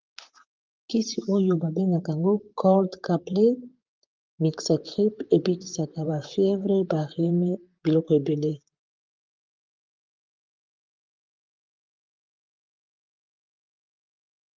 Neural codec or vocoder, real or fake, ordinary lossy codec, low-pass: vocoder, 44.1 kHz, 128 mel bands, Pupu-Vocoder; fake; Opus, 24 kbps; 7.2 kHz